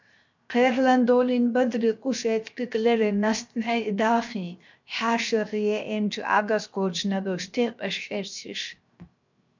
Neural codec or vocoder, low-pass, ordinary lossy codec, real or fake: codec, 16 kHz, 0.7 kbps, FocalCodec; 7.2 kHz; MP3, 64 kbps; fake